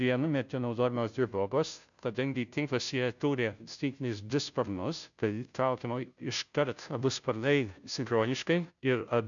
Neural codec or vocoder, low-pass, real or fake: codec, 16 kHz, 0.5 kbps, FunCodec, trained on Chinese and English, 25 frames a second; 7.2 kHz; fake